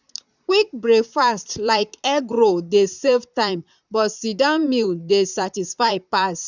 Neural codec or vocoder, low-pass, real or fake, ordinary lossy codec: vocoder, 44.1 kHz, 128 mel bands, Pupu-Vocoder; 7.2 kHz; fake; none